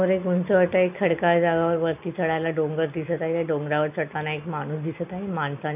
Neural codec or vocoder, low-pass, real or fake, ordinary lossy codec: none; 3.6 kHz; real; none